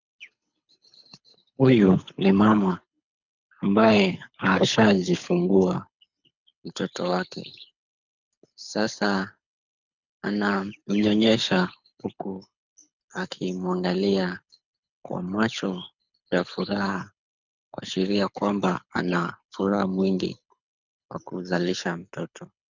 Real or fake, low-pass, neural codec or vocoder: fake; 7.2 kHz; codec, 24 kHz, 6 kbps, HILCodec